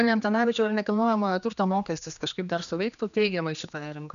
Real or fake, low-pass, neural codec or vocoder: fake; 7.2 kHz; codec, 16 kHz, 2 kbps, X-Codec, HuBERT features, trained on general audio